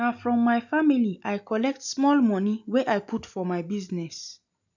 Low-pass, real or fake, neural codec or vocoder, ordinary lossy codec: 7.2 kHz; real; none; none